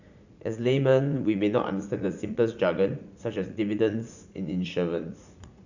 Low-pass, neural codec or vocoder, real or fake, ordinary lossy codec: 7.2 kHz; vocoder, 44.1 kHz, 80 mel bands, Vocos; fake; none